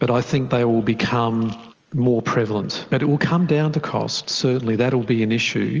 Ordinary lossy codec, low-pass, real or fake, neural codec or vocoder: Opus, 24 kbps; 7.2 kHz; real; none